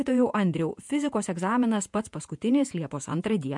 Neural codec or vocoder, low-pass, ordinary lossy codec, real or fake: vocoder, 48 kHz, 128 mel bands, Vocos; 10.8 kHz; MP3, 64 kbps; fake